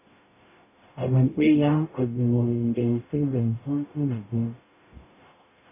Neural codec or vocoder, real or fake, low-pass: codec, 44.1 kHz, 0.9 kbps, DAC; fake; 3.6 kHz